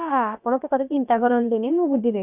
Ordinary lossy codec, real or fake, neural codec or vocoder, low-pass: none; fake; codec, 16 kHz, about 1 kbps, DyCAST, with the encoder's durations; 3.6 kHz